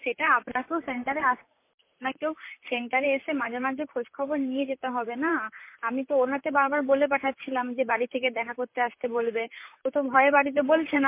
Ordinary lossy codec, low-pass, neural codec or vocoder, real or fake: MP3, 24 kbps; 3.6 kHz; vocoder, 44.1 kHz, 128 mel bands, Pupu-Vocoder; fake